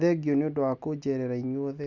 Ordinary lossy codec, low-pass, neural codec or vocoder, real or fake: none; 7.2 kHz; none; real